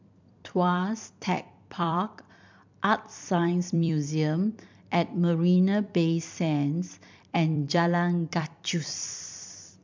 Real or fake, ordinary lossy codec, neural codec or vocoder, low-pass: fake; MP3, 64 kbps; vocoder, 44.1 kHz, 128 mel bands every 256 samples, BigVGAN v2; 7.2 kHz